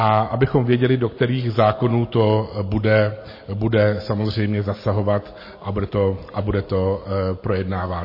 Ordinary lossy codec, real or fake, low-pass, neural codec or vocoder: MP3, 24 kbps; real; 5.4 kHz; none